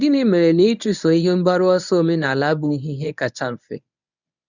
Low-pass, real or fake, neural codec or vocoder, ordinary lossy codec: 7.2 kHz; fake; codec, 24 kHz, 0.9 kbps, WavTokenizer, medium speech release version 1; none